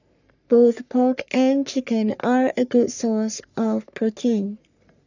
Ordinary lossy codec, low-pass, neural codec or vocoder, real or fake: none; 7.2 kHz; codec, 44.1 kHz, 3.4 kbps, Pupu-Codec; fake